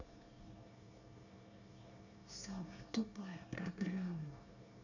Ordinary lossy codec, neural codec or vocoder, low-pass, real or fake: none; codec, 24 kHz, 1 kbps, SNAC; 7.2 kHz; fake